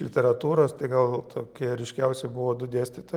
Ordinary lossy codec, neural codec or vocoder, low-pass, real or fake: Opus, 24 kbps; none; 19.8 kHz; real